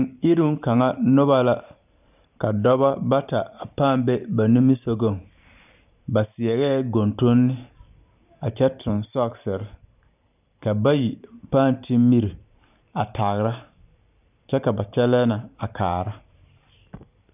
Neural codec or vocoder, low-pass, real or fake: vocoder, 44.1 kHz, 128 mel bands every 512 samples, BigVGAN v2; 3.6 kHz; fake